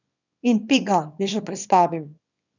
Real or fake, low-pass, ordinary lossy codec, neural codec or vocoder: fake; 7.2 kHz; none; codec, 24 kHz, 0.9 kbps, WavTokenizer, small release